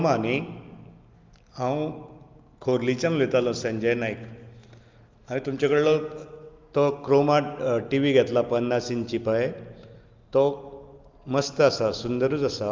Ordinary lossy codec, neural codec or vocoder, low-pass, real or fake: Opus, 24 kbps; none; 7.2 kHz; real